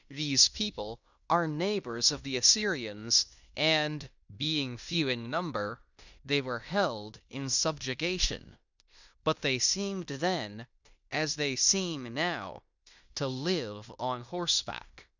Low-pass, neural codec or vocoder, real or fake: 7.2 kHz; codec, 16 kHz in and 24 kHz out, 0.9 kbps, LongCat-Audio-Codec, fine tuned four codebook decoder; fake